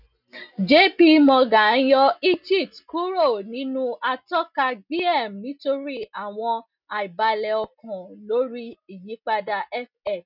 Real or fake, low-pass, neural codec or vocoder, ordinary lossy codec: real; 5.4 kHz; none; none